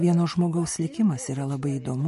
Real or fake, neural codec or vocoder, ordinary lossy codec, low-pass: real; none; MP3, 48 kbps; 14.4 kHz